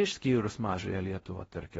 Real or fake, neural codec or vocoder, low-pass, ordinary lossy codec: fake; codec, 16 kHz in and 24 kHz out, 0.6 kbps, FocalCodec, streaming, 4096 codes; 10.8 kHz; AAC, 24 kbps